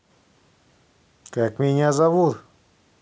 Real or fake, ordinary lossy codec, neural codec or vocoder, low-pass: real; none; none; none